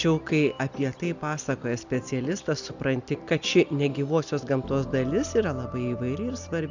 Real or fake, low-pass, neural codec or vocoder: real; 7.2 kHz; none